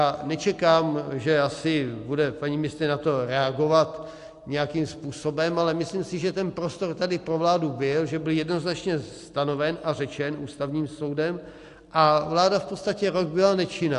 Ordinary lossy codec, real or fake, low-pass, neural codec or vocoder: AAC, 64 kbps; real; 10.8 kHz; none